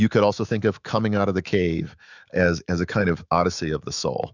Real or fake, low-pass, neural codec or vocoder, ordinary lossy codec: fake; 7.2 kHz; autoencoder, 48 kHz, 128 numbers a frame, DAC-VAE, trained on Japanese speech; Opus, 64 kbps